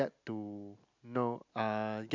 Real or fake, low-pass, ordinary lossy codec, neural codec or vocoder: real; 7.2 kHz; MP3, 64 kbps; none